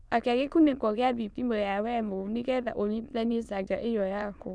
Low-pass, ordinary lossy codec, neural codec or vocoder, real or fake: none; none; autoencoder, 22.05 kHz, a latent of 192 numbers a frame, VITS, trained on many speakers; fake